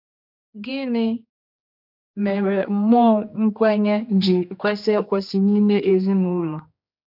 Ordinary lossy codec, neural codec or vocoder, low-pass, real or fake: none; codec, 16 kHz, 2 kbps, X-Codec, HuBERT features, trained on general audio; 5.4 kHz; fake